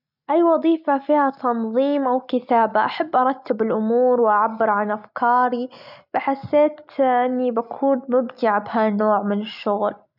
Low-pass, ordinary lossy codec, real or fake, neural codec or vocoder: 5.4 kHz; none; real; none